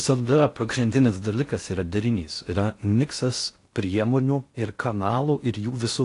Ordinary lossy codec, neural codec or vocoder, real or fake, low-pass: AAC, 48 kbps; codec, 16 kHz in and 24 kHz out, 0.6 kbps, FocalCodec, streaming, 4096 codes; fake; 10.8 kHz